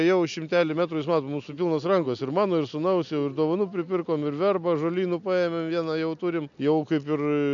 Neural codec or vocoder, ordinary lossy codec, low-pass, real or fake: none; MP3, 64 kbps; 7.2 kHz; real